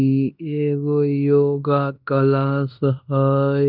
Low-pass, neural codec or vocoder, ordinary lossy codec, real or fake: 5.4 kHz; codec, 16 kHz in and 24 kHz out, 0.9 kbps, LongCat-Audio-Codec, fine tuned four codebook decoder; none; fake